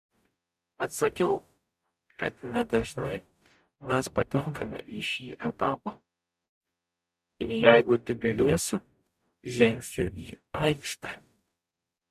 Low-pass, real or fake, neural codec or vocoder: 14.4 kHz; fake; codec, 44.1 kHz, 0.9 kbps, DAC